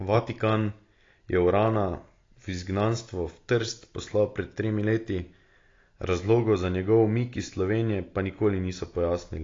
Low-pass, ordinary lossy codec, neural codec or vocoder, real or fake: 7.2 kHz; AAC, 32 kbps; none; real